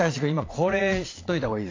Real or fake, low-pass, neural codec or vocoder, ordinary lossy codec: fake; 7.2 kHz; vocoder, 22.05 kHz, 80 mel bands, Vocos; AAC, 32 kbps